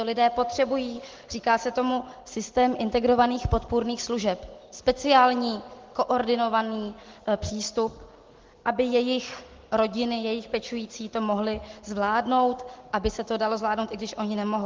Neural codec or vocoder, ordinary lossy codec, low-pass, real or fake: none; Opus, 16 kbps; 7.2 kHz; real